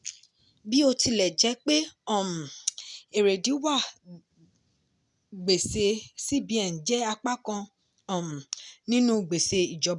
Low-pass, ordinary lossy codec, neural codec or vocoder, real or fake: 10.8 kHz; none; none; real